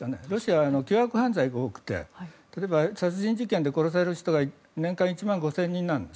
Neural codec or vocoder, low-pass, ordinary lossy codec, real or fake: none; none; none; real